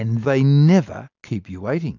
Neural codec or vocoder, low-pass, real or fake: none; 7.2 kHz; real